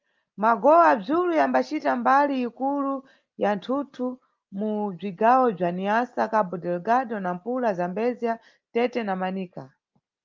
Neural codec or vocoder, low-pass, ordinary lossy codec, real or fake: none; 7.2 kHz; Opus, 24 kbps; real